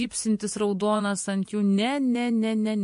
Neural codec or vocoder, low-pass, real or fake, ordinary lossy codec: vocoder, 24 kHz, 100 mel bands, Vocos; 10.8 kHz; fake; MP3, 48 kbps